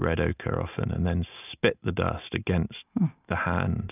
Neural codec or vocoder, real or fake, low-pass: none; real; 3.6 kHz